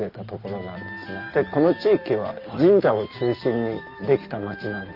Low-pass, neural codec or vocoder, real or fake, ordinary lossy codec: 5.4 kHz; none; real; Opus, 32 kbps